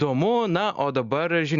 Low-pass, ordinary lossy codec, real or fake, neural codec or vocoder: 7.2 kHz; Opus, 64 kbps; real; none